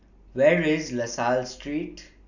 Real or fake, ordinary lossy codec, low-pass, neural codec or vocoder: real; none; 7.2 kHz; none